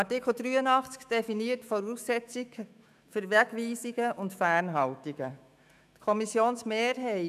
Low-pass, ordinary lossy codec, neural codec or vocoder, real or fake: 14.4 kHz; none; autoencoder, 48 kHz, 128 numbers a frame, DAC-VAE, trained on Japanese speech; fake